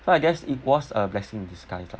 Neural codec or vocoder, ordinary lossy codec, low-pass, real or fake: none; none; none; real